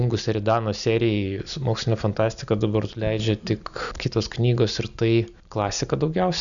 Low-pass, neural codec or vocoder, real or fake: 7.2 kHz; none; real